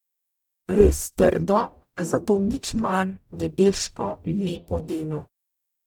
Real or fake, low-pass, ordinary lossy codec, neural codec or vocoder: fake; 19.8 kHz; none; codec, 44.1 kHz, 0.9 kbps, DAC